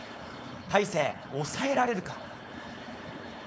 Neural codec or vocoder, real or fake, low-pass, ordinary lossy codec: codec, 16 kHz, 4.8 kbps, FACodec; fake; none; none